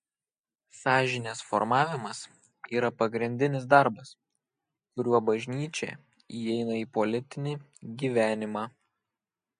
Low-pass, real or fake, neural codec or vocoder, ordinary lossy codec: 9.9 kHz; real; none; MP3, 48 kbps